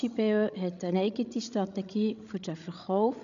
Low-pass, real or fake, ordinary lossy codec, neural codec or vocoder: 7.2 kHz; fake; none; codec, 16 kHz, 16 kbps, FreqCodec, larger model